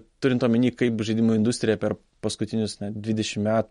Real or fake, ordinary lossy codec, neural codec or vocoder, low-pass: real; MP3, 48 kbps; none; 19.8 kHz